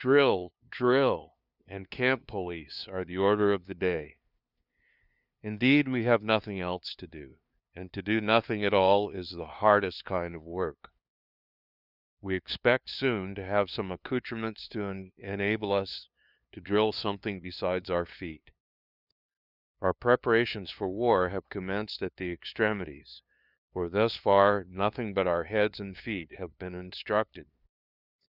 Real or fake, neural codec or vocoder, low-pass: fake; codec, 16 kHz, 2 kbps, FunCodec, trained on LibriTTS, 25 frames a second; 5.4 kHz